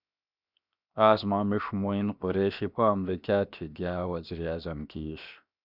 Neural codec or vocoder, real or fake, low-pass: codec, 16 kHz, 0.7 kbps, FocalCodec; fake; 5.4 kHz